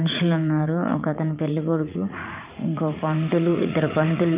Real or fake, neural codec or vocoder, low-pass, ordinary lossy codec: fake; vocoder, 44.1 kHz, 80 mel bands, Vocos; 3.6 kHz; Opus, 24 kbps